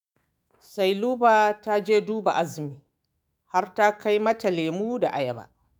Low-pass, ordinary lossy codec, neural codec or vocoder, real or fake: none; none; autoencoder, 48 kHz, 128 numbers a frame, DAC-VAE, trained on Japanese speech; fake